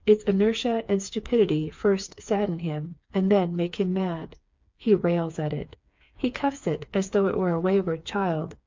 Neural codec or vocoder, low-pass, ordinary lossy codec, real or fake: codec, 16 kHz, 4 kbps, FreqCodec, smaller model; 7.2 kHz; MP3, 64 kbps; fake